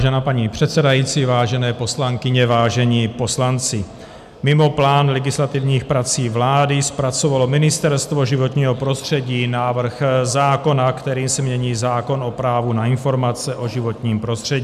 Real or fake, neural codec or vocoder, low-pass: real; none; 14.4 kHz